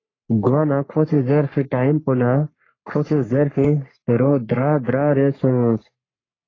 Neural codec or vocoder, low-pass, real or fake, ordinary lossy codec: codec, 44.1 kHz, 3.4 kbps, Pupu-Codec; 7.2 kHz; fake; AAC, 32 kbps